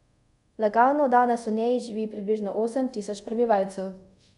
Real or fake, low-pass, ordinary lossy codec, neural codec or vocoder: fake; 10.8 kHz; none; codec, 24 kHz, 0.5 kbps, DualCodec